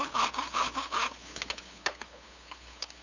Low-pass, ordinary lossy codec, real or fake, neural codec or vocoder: 7.2 kHz; none; fake; codec, 16 kHz, 2 kbps, FunCodec, trained on LibriTTS, 25 frames a second